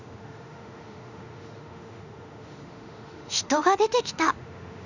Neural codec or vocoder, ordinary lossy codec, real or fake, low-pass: autoencoder, 48 kHz, 32 numbers a frame, DAC-VAE, trained on Japanese speech; none; fake; 7.2 kHz